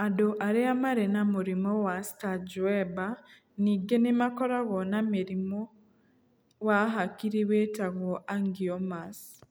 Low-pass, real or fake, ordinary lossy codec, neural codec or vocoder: none; real; none; none